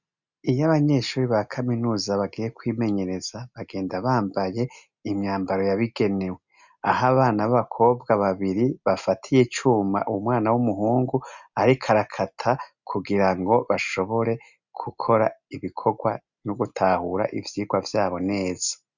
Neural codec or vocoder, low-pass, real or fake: none; 7.2 kHz; real